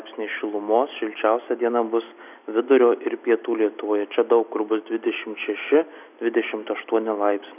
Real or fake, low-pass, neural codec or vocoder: real; 3.6 kHz; none